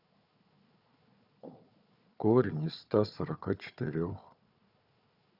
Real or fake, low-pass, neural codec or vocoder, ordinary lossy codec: fake; 5.4 kHz; codec, 16 kHz, 4 kbps, FunCodec, trained on Chinese and English, 50 frames a second; Opus, 64 kbps